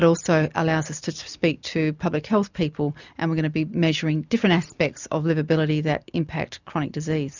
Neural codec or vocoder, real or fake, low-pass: none; real; 7.2 kHz